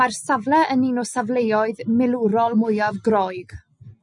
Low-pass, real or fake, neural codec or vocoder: 10.8 kHz; real; none